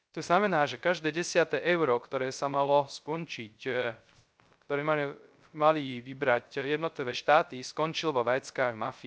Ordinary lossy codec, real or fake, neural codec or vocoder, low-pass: none; fake; codec, 16 kHz, 0.3 kbps, FocalCodec; none